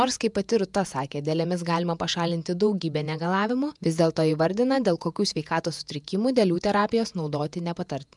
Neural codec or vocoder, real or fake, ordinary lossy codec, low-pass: vocoder, 48 kHz, 128 mel bands, Vocos; fake; MP3, 96 kbps; 10.8 kHz